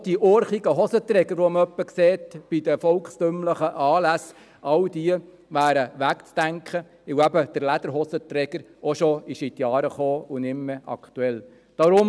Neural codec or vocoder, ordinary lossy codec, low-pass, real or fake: none; none; none; real